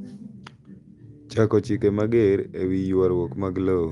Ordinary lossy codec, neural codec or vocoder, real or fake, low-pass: Opus, 32 kbps; none; real; 10.8 kHz